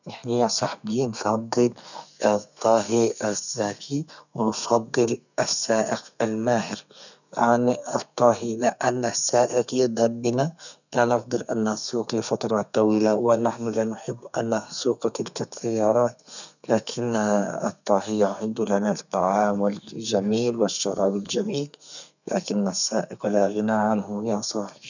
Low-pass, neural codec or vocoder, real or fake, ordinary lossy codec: 7.2 kHz; codec, 32 kHz, 1.9 kbps, SNAC; fake; none